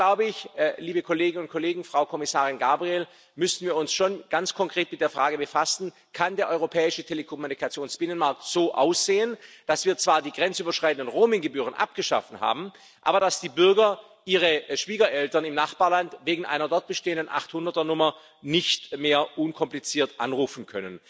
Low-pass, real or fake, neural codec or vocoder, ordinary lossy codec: none; real; none; none